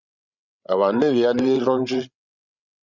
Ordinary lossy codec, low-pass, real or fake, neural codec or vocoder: Opus, 64 kbps; 7.2 kHz; fake; codec, 16 kHz, 16 kbps, FreqCodec, larger model